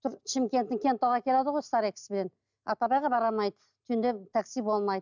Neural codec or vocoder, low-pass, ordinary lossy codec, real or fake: none; 7.2 kHz; none; real